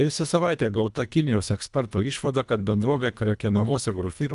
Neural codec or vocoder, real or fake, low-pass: codec, 24 kHz, 1.5 kbps, HILCodec; fake; 10.8 kHz